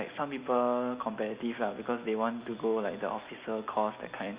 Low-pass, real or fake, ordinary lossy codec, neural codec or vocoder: 3.6 kHz; real; Opus, 64 kbps; none